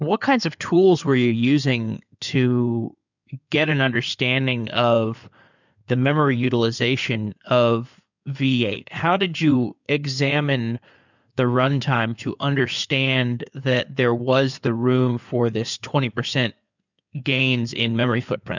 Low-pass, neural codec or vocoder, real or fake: 7.2 kHz; codec, 16 kHz in and 24 kHz out, 2.2 kbps, FireRedTTS-2 codec; fake